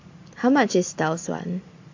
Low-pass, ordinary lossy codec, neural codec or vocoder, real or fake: 7.2 kHz; AAC, 48 kbps; vocoder, 44.1 kHz, 128 mel bands every 512 samples, BigVGAN v2; fake